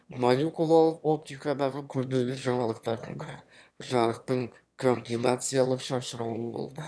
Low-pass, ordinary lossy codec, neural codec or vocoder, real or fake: none; none; autoencoder, 22.05 kHz, a latent of 192 numbers a frame, VITS, trained on one speaker; fake